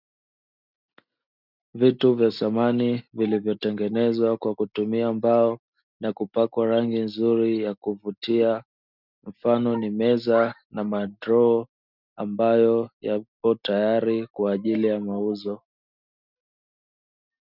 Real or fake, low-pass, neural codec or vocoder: real; 5.4 kHz; none